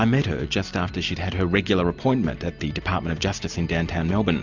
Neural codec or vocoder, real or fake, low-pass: none; real; 7.2 kHz